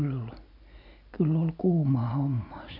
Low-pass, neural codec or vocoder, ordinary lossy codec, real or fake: 5.4 kHz; none; none; real